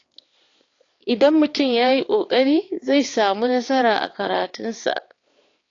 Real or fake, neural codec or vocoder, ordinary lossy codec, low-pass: fake; codec, 16 kHz, 4 kbps, X-Codec, HuBERT features, trained on balanced general audio; AAC, 32 kbps; 7.2 kHz